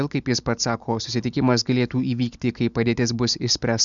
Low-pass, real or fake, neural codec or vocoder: 7.2 kHz; real; none